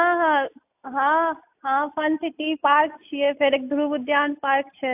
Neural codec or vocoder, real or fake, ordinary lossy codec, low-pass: none; real; AAC, 32 kbps; 3.6 kHz